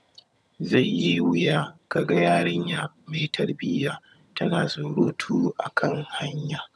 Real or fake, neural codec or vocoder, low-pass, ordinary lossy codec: fake; vocoder, 22.05 kHz, 80 mel bands, HiFi-GAN; none; none